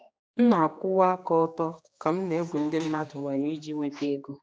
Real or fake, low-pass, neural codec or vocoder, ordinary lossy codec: fake; none; codec, 16 kHz, 2 kbps, X-Codec, HuBERT features, trained on general audio; none